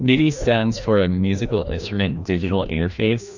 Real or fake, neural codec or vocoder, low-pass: fake; codec, 16 kHz, 1 kbps, FreqCodec, larger model; 7.2 kHz